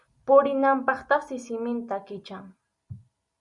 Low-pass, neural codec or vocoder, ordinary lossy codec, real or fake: 10.8 kHz; none; Opus, 64 kbps; real